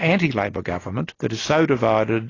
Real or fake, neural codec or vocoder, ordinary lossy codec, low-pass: real; none; AAC, 32 kbps; 7.2 kHz